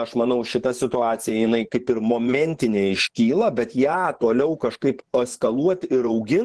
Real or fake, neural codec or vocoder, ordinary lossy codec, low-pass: fake; vocoder, 24 kHz, 100 mel bands, Vocos; Opus, 16 kbps; 10.8 kHz